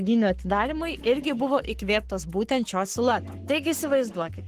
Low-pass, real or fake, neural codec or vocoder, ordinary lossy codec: 14.4 kHz; fake; codec, 44.1 kHz, 7.8 kbps, Pupu-Codec; Opus, 16 kbps